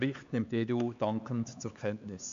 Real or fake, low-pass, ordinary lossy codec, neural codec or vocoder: fake; 7.2 kHz; MP3, 64 kbps; codec, 16 kHz, 4 kbps, X-Codec, HuBERT features, trained on LibriSpeech